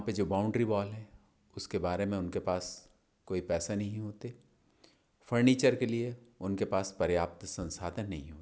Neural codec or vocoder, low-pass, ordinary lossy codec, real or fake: none; none; none; real